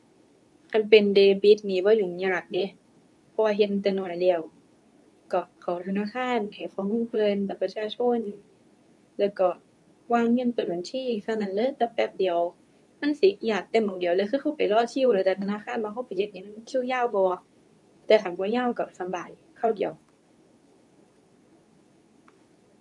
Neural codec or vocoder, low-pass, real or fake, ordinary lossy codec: codec, 24 kHz, 0.9 kbps, WavTokenizer, medium speech release version 2; none; fake; none